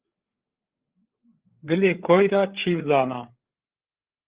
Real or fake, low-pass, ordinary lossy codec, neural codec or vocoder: fake; 3.6 kHz; Opus, 16 kbps; codec, 16 kHz, 8 kbps, FreqCodec, larger model